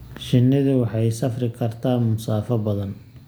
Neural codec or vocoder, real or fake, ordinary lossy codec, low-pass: none; real; none; none